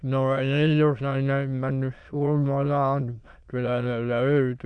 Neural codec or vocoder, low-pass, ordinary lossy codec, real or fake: autoencoder, 22.05 kHz, a latent of 192 numbers a frame, VITS, trained on many speakers; 9.9 kHz; none; fake